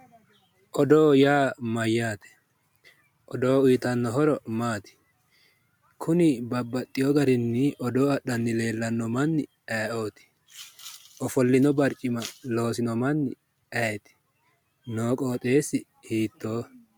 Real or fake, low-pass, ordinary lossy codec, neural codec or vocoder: real; 19.8 kHz; MP3, 96 kbps; none